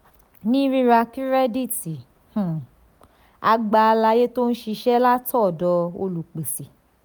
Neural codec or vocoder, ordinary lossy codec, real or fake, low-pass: none; none; real; none